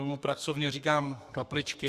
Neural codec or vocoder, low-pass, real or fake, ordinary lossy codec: codec, 32 kHz, 1.9 kbps, SNAC; 14.4 kHz; fake; Opus, 64 kbps